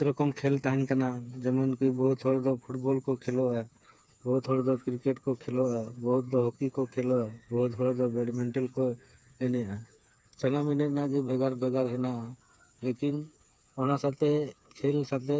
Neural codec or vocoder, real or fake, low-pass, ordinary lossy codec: codec, 16 kHz, 4 kbps, FreqCodec, smaller model; fake; none; none